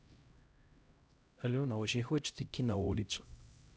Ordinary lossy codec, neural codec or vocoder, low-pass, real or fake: none; codec, 16 kHz, 0.5 kbps, X-Codec, HuBERT features, trained on LibriSpeech; none; fake